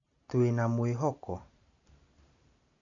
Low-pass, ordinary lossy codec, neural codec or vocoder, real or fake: 7.2 kHz; none; none; real